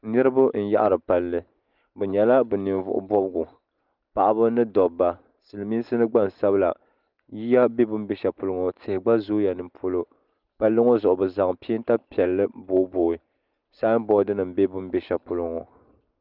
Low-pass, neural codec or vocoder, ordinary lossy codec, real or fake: 5.4 kHz; none; Opus, 24 kbps; real